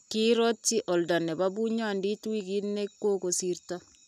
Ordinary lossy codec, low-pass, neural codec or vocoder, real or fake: none; 14.4 kHz; none; real